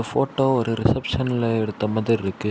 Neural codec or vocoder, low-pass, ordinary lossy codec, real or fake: none; none; none; real